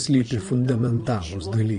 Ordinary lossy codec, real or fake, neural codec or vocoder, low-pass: MP3, 48 kbps; fake; vocoder, 22.05 kHz, 80 mel bands, Vocos; 9.9 kHz